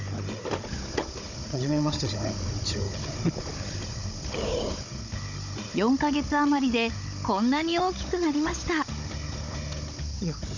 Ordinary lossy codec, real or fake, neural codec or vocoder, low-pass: none; fake; codec, 16 kHz, 16 kbps, FunCodec, trained on Chinese and English, 50 frames a second; 7.2 kHz